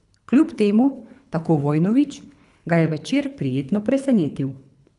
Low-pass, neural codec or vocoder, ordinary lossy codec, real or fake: 10.8 kHz; codec, 24 kHz, 3 kbps, HILCodec; none; fake